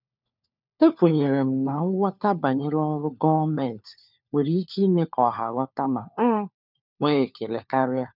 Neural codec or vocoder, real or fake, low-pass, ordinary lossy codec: codec, 16 kHz, 4 kbps, FunCodec, trained on LibriTTS, 50 frames a second; fake; 5.4 kHz; none